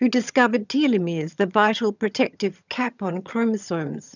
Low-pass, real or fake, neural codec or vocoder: 7.2 kHz; fake; vocoder, 22.05 kHz, 80 mel bands, HiFi-GAN